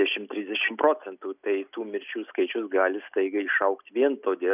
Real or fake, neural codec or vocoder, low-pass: real; none; 3.6 kHz